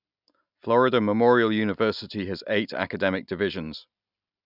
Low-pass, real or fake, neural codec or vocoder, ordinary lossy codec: 5.4 kHz; real; none; none